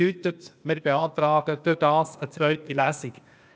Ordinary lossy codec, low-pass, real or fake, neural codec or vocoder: none; none; fake; codec, 16 kHz, 0.8 kbps, ZipCodec